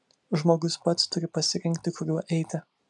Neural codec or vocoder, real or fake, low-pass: none; real; 10.8 kHz